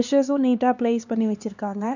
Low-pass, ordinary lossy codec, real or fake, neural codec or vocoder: 7.2 kHz; none; fake; codec, 16 kHz, 2 kbps, X-Codec, HuBERT features, trained on LibriSpeech